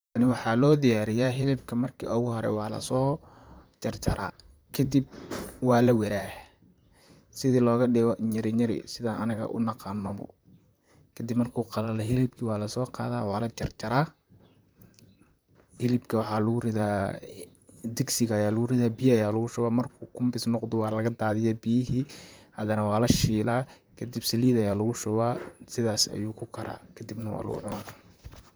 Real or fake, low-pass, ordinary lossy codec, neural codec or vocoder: fake; none; none; vocoder, 44.1 kHz, 128 mel bands, Pupu-Vocoder